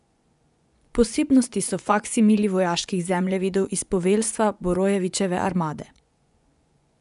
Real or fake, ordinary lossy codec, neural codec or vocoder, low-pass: fake; none; vocoder, 24 kHz, 100 mel bands, Vocos; 10.8 kHz